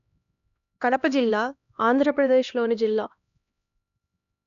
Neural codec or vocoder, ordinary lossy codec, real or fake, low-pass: codec, 16 kHz, 1 kbps, X-Codec, HuBERT features, trained on LibriSpeech; none; fake; 7.2 kHz